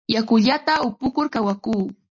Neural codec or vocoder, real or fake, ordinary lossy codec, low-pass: none; real; MP3, 32 kbps; 7.2 kHz